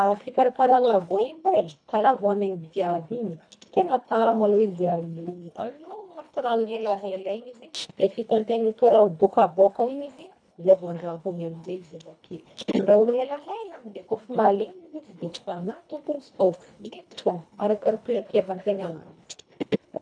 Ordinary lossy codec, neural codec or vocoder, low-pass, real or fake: none; codec, 24 kHz, 1.5 kbps, HILCodec; 9.9 kHz; fake